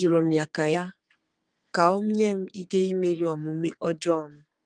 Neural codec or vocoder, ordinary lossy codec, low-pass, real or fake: codec, 32 kHz, 1.9 kbps, SNAC; Opus, 32 kbps; 9.9 kHz; fake